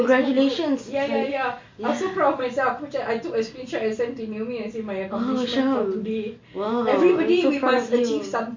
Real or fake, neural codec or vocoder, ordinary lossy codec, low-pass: real; none; MP3, 48 kbps; 7.2 kHz